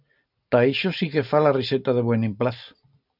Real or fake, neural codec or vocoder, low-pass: real; none; 5.4 kHz